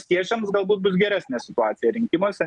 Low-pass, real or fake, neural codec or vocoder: 10.8 kHz; real; none